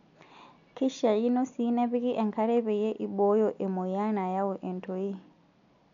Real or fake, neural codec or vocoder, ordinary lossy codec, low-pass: real; none; none; 7.2 kHz